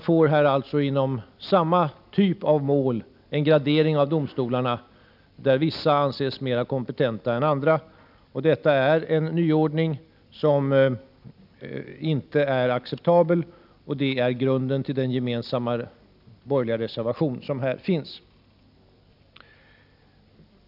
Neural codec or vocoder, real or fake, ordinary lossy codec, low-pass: none; real; none; 5.4 kHz